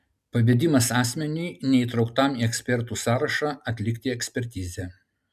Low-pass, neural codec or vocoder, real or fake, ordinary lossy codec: 14.4 kHz; none; real; MP3, 96 kbps